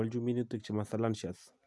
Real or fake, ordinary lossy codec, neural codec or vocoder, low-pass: real; none; none; none